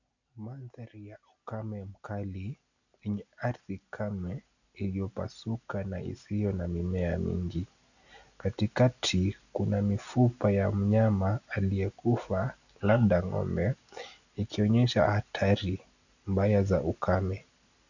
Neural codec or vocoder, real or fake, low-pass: none; real; 7.2 kHz